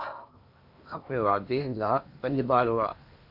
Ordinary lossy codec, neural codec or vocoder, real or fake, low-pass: AAC, 48 kbps; codec, 16 kHz in and 24 kHz out, 0.8 kbps, FocalCodec, streaming, 65536 codes; fake; 5.4 kHz